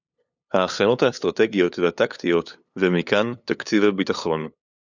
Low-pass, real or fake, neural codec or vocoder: 7.2 kHz; fake; codec, 16 kHz, 8 kbps, FunCodec, trained on LibriTTS, 25 frames a second